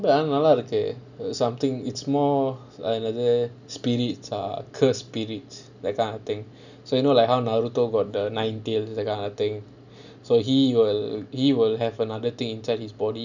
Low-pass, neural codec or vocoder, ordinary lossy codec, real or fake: 7.2 kHz; none; none; real